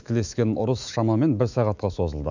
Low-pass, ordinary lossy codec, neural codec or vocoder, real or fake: 7.2 kHz; none; autoencoder, 48 kHz, 128 numbers a frame, DAC-VAE, trained on Japanese speech; fake